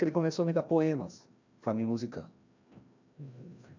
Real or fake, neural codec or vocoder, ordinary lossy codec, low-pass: fake; codec, 16 kHz, 1 kbps, FreqCodec, larger model; AAC, 48 kbps; 7.2 kHz